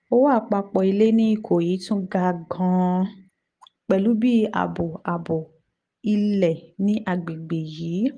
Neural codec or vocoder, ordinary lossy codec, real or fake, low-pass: none; Opus, 24 kbps; real; 9.9 kHz